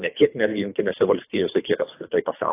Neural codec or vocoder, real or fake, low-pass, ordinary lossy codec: codec, 24 kHz, 3 kbps, HILCodec; fake; 3.6 kHz; AAC, 16 kbps